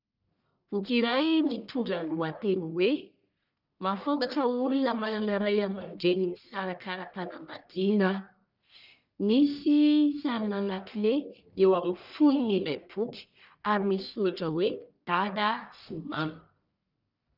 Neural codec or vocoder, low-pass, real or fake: codec, 44.1 kHz, 1.7 kbps, Pupu-Codec; 5.4 kHz; fake